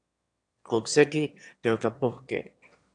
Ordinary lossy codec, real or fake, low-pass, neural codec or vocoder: AAC, 64 kbps; fake; 9.9 kHz; autoencoder, 22.05 kHz, a latent of 192 numbers a frame, VITS, trained on one speaker